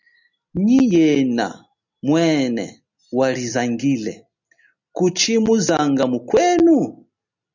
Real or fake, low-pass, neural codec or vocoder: real; 7.2 kHz; none